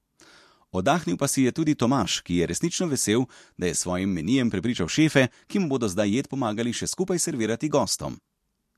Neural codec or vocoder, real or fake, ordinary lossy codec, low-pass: none; real; MP3, 64 kbps; 14.4 kHz